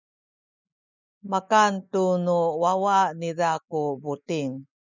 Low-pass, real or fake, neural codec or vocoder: 7.2 kHz; real; none